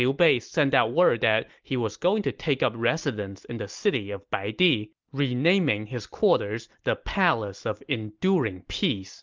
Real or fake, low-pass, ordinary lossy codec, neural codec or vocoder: real; 7.2 kHz; Opus, 32 kbps; none